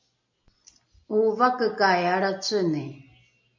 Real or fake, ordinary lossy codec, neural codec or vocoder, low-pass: real; MP3, 48 kbps; none; 7.2 kHz